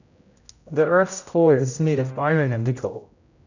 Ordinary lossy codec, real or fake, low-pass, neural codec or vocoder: none; fake; 7.2 kHz; codec, 16 kHz, 0.5 kbps, X-Codec, HuBERT features, trained on general audio